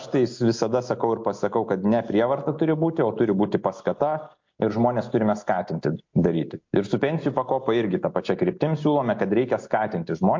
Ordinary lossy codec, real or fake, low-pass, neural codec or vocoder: MP3, 48 kbps; real; 7.2 kHz; none